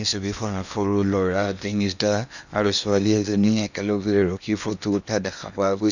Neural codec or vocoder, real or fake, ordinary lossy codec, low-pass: codec, 16 kHz in and 24 kHz out, 0.8 kbps, FocalCodec, streaming, 65536 codes; fake; none; 7.2 kHz